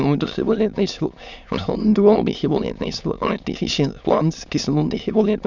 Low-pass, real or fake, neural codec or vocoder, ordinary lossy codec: 7.2 kHz; fake; autoencoder, 22.05 kHz, a latent of 192 numbers a frame, VITS, trained on many speakers; none